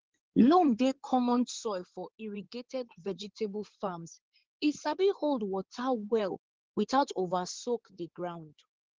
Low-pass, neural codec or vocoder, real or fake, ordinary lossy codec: 7.2 kHz; codec, 16 kHz in and 24 kHz out, 2.2 kbps, FireRedTTS-2 codec; fake; Opus, 16 kbps